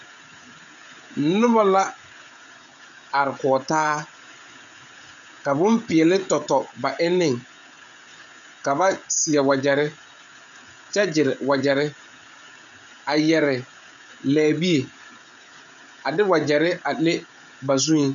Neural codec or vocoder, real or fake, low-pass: codec, 16 kHz, 16 kbps, FreqCodec, smaller model; fake; 7.2 kHz